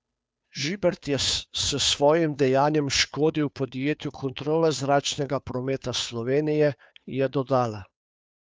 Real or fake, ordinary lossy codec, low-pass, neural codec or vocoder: fake; none; none; codec, 16 kHz, 2 kbps, FunCodec, trained on Chinese and English, 25 frames a second